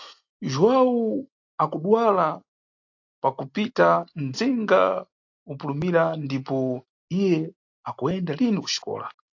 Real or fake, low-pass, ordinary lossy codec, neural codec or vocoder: real; 7.2 kHz; AAC, 48 kbps; none